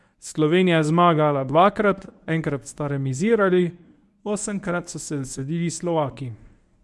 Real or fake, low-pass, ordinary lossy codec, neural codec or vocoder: fake; none; none; codec, 24 kHz, 0.9 kbps, WavTokenizer, medium speech release version 1